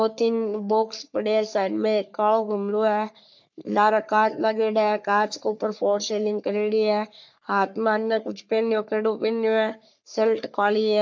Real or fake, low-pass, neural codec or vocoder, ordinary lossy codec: fake; 7.2 kHz; codec, 44.1 kHz, 3.4 kbps, Pupu-Codec; MP3, 64 kbps